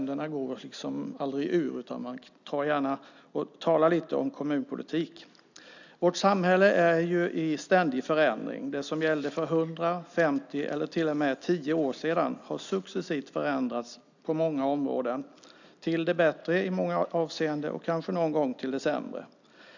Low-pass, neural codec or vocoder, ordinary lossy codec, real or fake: 7.2 kHz; none; none; real